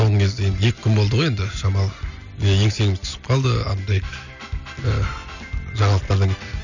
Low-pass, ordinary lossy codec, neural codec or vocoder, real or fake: 7.2 kHz; none; none; real